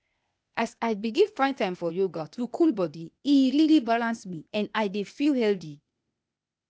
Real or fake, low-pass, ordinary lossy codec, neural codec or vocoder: fake; none; none; codec, 16 kHz, 0.8 kbps, ZipCodec